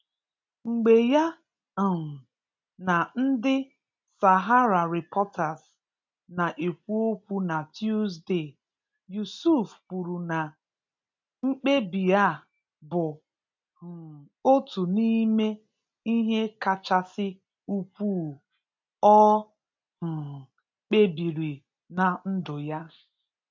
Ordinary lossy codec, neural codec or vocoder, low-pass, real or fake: MP3, 64 kbps; none; 7.2 kHz; real